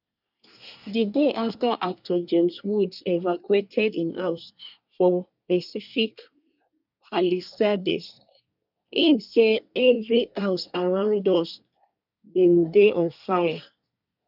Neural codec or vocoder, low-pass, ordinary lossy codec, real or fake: codec, 24 kHz, 1 kbps, SNAC; 5.4 kHz; none; fake